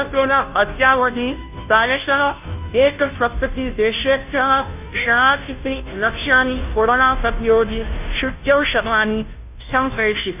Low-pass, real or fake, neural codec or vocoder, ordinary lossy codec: 3.6 kHz; fake; codec, 16 kHz, 0.5 kbps, FunCodec, trained on Chinese and English, 25 frames a second; none